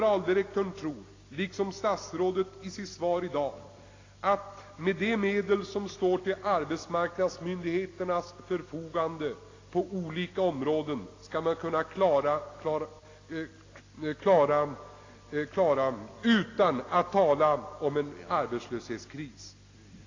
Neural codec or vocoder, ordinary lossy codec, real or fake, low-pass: none; AAC, 32 kbps; real; 7.2 kHz